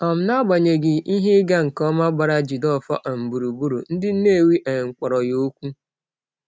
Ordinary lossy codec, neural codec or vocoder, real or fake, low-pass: none; none; real; none